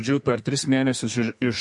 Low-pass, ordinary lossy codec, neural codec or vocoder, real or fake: 10.8 kHz; MP3, 48 kbps; codec, 32 kHz, 1.9 kbps, SNAC; fake